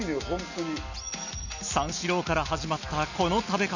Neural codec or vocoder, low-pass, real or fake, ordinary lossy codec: none; 7.2 kHz; real; none